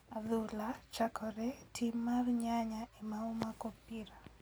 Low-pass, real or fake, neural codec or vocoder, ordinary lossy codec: none; real; none; none